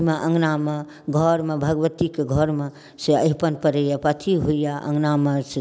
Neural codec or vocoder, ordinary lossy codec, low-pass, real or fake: none; none; none; real